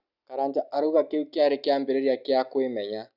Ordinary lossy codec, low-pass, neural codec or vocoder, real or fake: none; 5.4 kHz; none; real